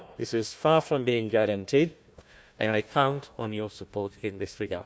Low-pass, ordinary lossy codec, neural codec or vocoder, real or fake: none; none; codec, 16 kHz, 1 kbps, FunCodec, trained on Chinese and English, 50 frames a second; fake